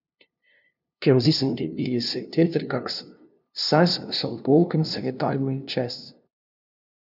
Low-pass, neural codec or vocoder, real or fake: 5.4 kHz; codec, 16 kHz, 0.5 kbps, FunCodec, trained on LibriTTS, 25 frames a second; fake